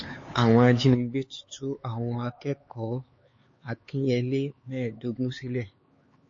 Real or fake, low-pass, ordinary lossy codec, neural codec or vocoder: fake; 7.2 kHz; MP3, 32 kbps; codec, 16 kHz, 4 kbps, X-Codec, HuBERT features, trained on LibriSpeech